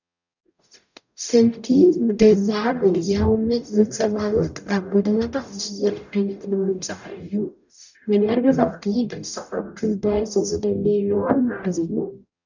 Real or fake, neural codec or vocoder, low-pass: fake; codec, 44.1 kHz, 0.9 kbps, DAC; 7.2 kHz